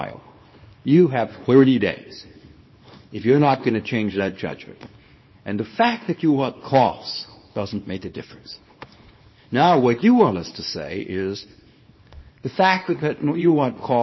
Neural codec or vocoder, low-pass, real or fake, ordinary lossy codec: codec, 24 kHz, 0.9 kbps, WavTokenizer, small release; 7.2 kHz; fake; MP3, 24 kbps